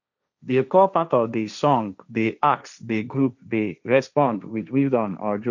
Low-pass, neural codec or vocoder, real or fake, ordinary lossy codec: 7.2 kHz; codec, 16 kHz, 1.1 kbps, Voila-Tokenizer; fake; none